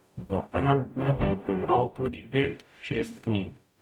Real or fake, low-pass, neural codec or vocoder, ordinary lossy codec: fake; 19.8 kHz; codec, 44.1 kHz, 0.9 kbps, DAC; none